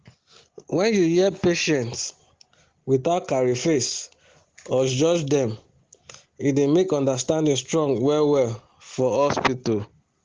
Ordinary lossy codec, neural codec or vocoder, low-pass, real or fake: Opus, 16 kbps; none; 7.2 kHz; real